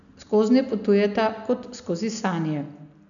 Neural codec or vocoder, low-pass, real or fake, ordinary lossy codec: none; 7.2 kHz; real; none